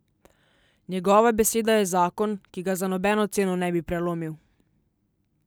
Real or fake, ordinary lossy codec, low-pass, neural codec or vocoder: real; none; none; none